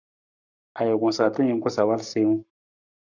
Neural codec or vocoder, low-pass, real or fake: codec, 44.1 kHz, 7.8 kbps, Pupu-Codec; 7.2 kHz; fake